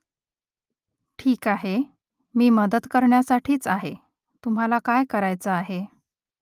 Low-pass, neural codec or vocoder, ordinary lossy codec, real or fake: 14.4 kHz; none; Opus, 32 kbps; real